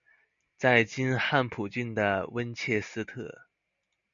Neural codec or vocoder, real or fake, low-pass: none; real; 7.2 kHz